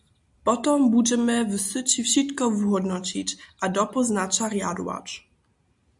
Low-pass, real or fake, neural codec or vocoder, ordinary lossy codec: 10.8 kHz; real; none; MP3, 96 kbps